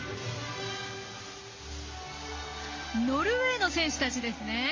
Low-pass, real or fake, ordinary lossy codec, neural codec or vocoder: 7.2 kHz; real; Opus, 32 kbps; none